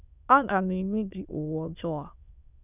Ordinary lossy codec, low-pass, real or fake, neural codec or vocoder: none; 3.6 kHz; fake; autoencoder, 22.05 kHz, a latent of 192 numbers a frame, VITS, trained on many speakers